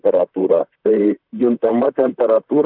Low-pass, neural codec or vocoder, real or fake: 5.4 kHz; vocoder, 44.1 kHz, 128 mel bands, Pupu-Vocoder; fake